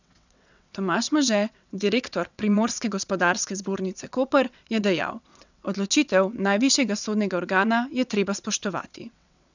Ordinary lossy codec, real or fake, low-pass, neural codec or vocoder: none; fake; 7.2 kHz; vocoder, 22.05 kHz, 80 mel bands, WaveNeXt